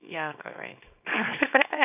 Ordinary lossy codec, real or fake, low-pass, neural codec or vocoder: none; fake; 3.6 kHz; codec, 24 kHz, 0.9 kbps, WavTokenizer, small release